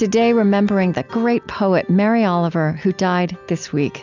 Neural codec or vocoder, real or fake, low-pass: none; real; 7.2 kHz